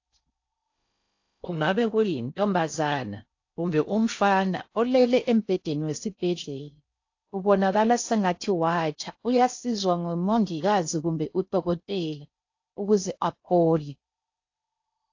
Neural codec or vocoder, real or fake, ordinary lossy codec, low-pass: codec, 16 kHz in and 24 kHz out, 0.6 kbps, FocalCodec, streaming, 4096 codes; fake; AAC, 48 kbps; 7.2 kHz